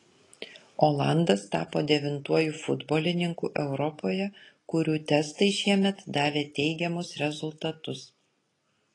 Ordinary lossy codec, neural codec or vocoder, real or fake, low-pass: AAC, 32 kbps; none; real; 10.8 kHz